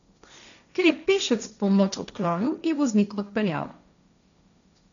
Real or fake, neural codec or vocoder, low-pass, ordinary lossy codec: fake; codec, 16 kHz, 1.1 kbps, Voila-Tokenizer; 7.2 kHz; none